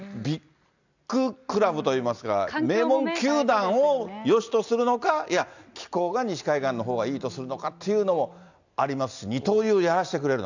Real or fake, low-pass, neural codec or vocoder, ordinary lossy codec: real; 7.2 kHz; none; none